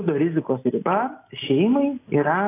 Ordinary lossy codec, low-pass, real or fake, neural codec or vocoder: AAC, 24 kbps; 3.6 kHz; real; none